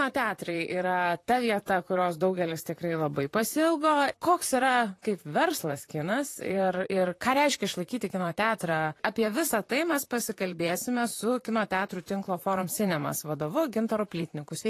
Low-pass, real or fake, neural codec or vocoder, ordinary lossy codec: 14.4 kHz; fake; vocoder, 44.1 kHz, 128 mel bands, Pupu-Vocoder; AAC, 48 kbps